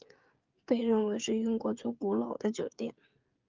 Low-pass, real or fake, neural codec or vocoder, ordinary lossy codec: 7.2 kHz; fake; codec, 16 kHz, 16 kbps, FreqCodec, smaller model; Opus, 24 kbps